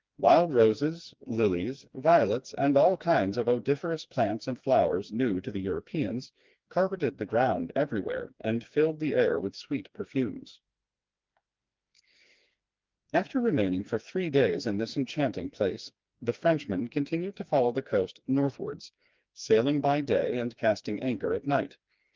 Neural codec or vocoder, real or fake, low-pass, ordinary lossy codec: codec, 16 kHz, 2 kbps, FreqCodec, smaller model; fake; 7.2 kHz; Opus, 24 kbps